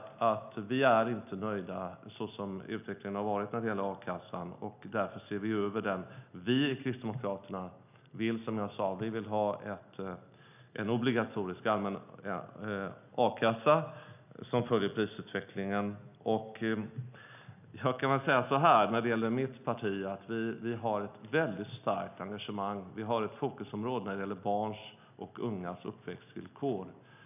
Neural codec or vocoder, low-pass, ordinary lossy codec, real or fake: none; 3.6 kHz; none; real